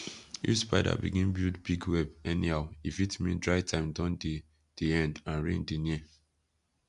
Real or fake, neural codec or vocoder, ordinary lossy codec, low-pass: real; none; AAC, 96 kbps; 10.8 kHz